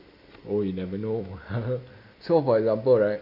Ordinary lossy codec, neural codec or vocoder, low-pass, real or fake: Opus, 64 kbps; none; 5.4 kHz; real